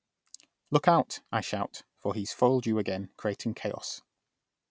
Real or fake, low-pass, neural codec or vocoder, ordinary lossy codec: real; none; none; none